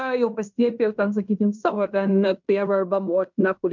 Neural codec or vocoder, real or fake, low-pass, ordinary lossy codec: codec, 16 kHz in and 24 kHz out, 0.9 kbps, LongCat-Audio-Codec, fine tuned four codebook decoder; fake; 7.2 kHz; MP3, 64 kbps